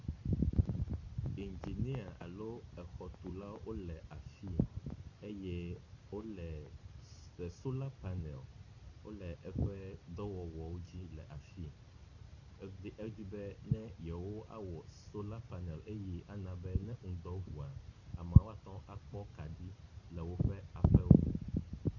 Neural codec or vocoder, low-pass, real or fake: none; 7.2 kHz; real